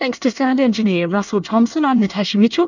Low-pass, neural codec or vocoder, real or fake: 7.2 kHz; codec, 24 kHz, 1 kbps, SNAC; fake